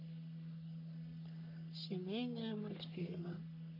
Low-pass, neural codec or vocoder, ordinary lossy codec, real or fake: 5.4 kHz; codec, 44.1 kHz, 3.4 kbps, Pupu-Codec; none; fake